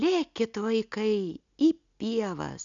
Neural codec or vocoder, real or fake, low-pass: none; real; 7.2 kHz